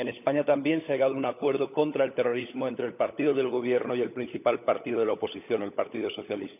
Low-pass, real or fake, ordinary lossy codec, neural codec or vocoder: 3.6 kHz; fake; none; codec, 16 kHz, 16 kbps, FunCodec, trained on LibriTTS, 50 frames a second